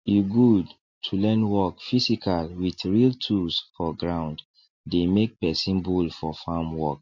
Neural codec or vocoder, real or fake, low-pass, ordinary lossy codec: none; real; 7.2 kHz; MP3, 48 kbps